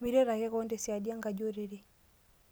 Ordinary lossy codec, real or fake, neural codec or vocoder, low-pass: none; real; none; none